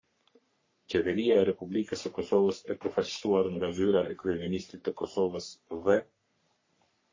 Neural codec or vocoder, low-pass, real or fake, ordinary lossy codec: codec, 44.1 kHz, 3.4 kbps, Pupu-Codec; 7.2 kHz; fake; MP3, 32 kbps